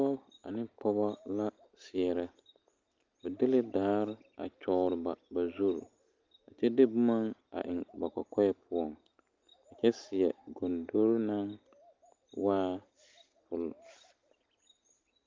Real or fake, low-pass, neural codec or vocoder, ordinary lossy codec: real; 7.2 kHz; none; Opus, 24 kbps